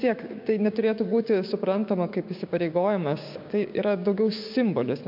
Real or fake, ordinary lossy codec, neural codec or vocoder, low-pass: fake; MP3, 48 kbps; codec, 16 kHz, 6 kbps, DAC; 5.4 kHz